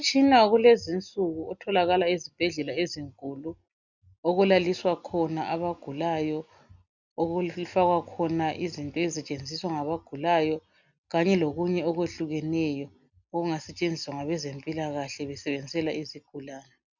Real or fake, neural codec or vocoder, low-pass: real; none; 7.2 kHz